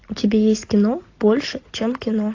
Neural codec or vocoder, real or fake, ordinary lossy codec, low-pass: none; real; AAC, 48 kbps; 7.2 kHz